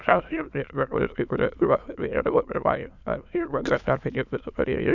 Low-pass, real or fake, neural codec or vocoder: 7.2 kHz; fake; autoencoder, 22.05 kHz, a latent of 192 numbers a frame, VITS, trained on many speakers